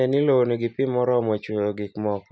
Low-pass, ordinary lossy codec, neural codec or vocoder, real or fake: none; none; none; real